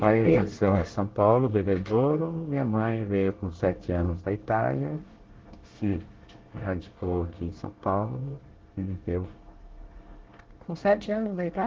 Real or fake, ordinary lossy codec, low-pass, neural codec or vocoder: fake; Opus, 16 kbps; 7.2 kHz; codec, 24 kHz, 1 kbps, SNAC